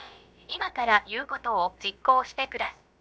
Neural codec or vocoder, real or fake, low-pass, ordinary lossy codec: codec, 16 kHz, about 1 kbps, DyCAST, with the encoder's durations; fake; none; none